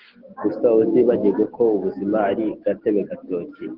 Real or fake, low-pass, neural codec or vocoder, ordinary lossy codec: real; 5.4 kHz; none; Opus, 16 kbps